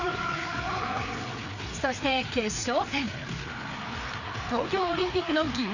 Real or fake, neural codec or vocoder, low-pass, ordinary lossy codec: fake; codec, 16 kHz, 4 kbps, FreqCodec, larger model; 7.2 kHz; none